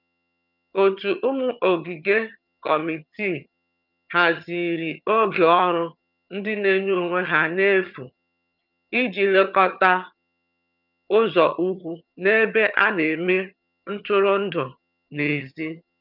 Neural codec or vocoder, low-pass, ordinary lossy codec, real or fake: vocoder, 22.05 kHz, 80 mel bands, HiFi-GAN; 5.4 kHz; none; fake